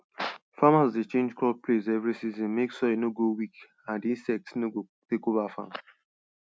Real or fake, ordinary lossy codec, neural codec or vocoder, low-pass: real; none; none; none